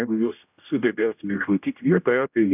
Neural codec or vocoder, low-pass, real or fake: codec, 16 kHz, 0.5 kbps, X-Codec, HuBERT features, trained on general audio; 3.6 kHz; fake